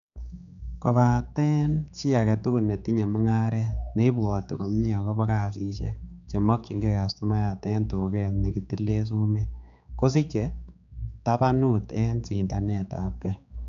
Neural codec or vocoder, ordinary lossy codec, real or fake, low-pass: codec, 16 kHz, 4 kbps, X-Codec, HuBERT features, trained on general audio; none; fake; 7.2 kHz